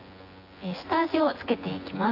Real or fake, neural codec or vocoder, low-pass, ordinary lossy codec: fake; vocoder, 24 kHz, 100 mel bands, Vocos; 5.4 kHz; AAC, 32 kbps